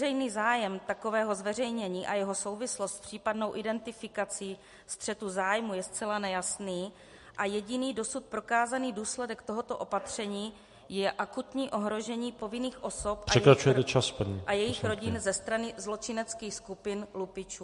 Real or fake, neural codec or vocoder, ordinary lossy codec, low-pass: real; none; MP3, 48 kbps; 14.4 kHz